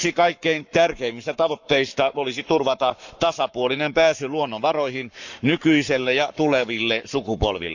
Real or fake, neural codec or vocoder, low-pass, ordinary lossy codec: fake; codec, 44.1 kHz, 7.8 kbps, Pupu-Codec; 7.2 kHz; none